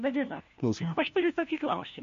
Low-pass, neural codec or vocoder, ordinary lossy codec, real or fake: 7.2 kHz; codec, 16 kHz, 1 kbps, FunCodec, trained on LibriTTS, 50 frames a second; MP3, 48 kbps; fake